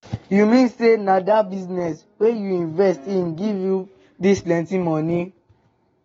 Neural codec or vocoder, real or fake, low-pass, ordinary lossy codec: none; real; 19.8 kHz; AAC, 24 kbps